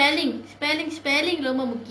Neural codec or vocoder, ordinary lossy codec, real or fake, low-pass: none; none; real; none